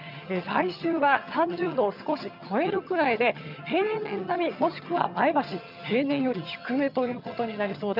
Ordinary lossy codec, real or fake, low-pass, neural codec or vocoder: none; fake; 5.4 kHz; vocoder, 22.05 kHz, 80 mel bands, HiFi-GAN